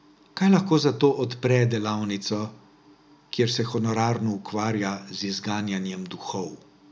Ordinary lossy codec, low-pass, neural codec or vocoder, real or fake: none; none; none; real